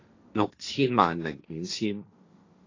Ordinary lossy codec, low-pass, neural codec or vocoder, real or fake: AAC, 32 kbps; 7.2 kHz; codec, 16 kHz, 1.1 kbps, Voila-Tokenizer; fake